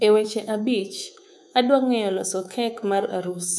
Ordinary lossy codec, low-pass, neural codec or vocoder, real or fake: none; none; codec, 24 kHz, 3.1 kbps, DualCodec; fake